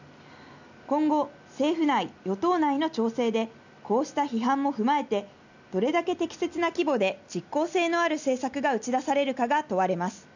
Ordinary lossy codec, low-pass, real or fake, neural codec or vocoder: none; 7.2 kHz; real; none